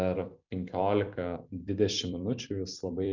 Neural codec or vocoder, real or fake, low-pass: vocoder, 44.1 kHz, 128 mel bands every 256 samples, BigVGAN v2; fake; 7.2 kHz